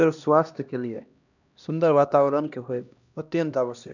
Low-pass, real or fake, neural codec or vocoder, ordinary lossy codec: 7.2 kHz; fake; codec, 16 kHz, 1 kbps, X-Codec, HuBERT features, trained on LibriSpeech; none